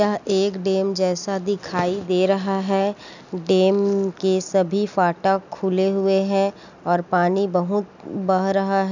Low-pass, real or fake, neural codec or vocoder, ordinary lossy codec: 7.2 kHz; real; none; none